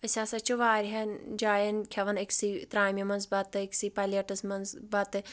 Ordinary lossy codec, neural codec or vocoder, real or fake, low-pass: none; none; real; none